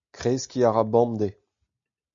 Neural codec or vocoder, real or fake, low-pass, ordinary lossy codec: none; real; 7.2 kHz; MP3, 48 kbps